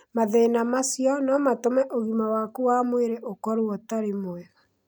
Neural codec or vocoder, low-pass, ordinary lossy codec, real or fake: none; none; none; real